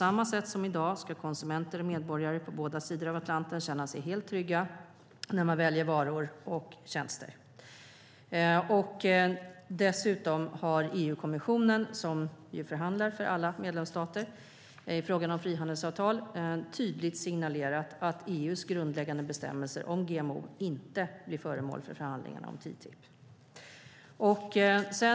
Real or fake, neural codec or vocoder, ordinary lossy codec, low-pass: real; none; none; none